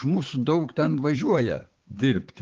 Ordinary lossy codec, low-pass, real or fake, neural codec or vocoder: Opus, 16 kbps; 7.2 kHz; fake; codec, 16 kHz, 4 kbps, X-Codec, HuBERT features, trained on balanced general audio